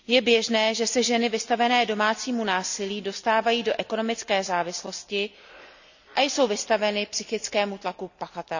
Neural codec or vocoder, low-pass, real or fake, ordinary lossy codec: none; 7.2 kHz; real; none